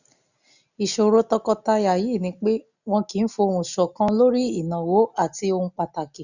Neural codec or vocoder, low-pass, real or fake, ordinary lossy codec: none; 7.2 kHz; real; none